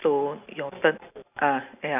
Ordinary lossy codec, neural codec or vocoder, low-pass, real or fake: none; none; 3.6 kHz; real